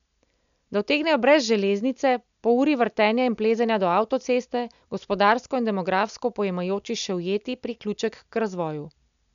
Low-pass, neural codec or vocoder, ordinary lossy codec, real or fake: 7.2 kHz; none; none; real